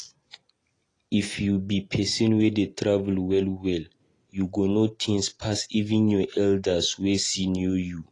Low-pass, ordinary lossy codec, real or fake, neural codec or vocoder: 10.8 kHz; AAC, 32 kbps; real; none